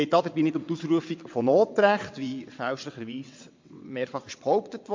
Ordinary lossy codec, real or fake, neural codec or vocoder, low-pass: MP3, 48 kbps; fake; vocoder, 22.05 kHz, 80 mel bands, WaveNeXt; 7.2 kHz